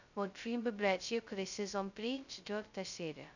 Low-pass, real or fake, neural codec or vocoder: 7.2 kHz; fake; codec, 16 kHz, 0.2 kbps, FocalCodec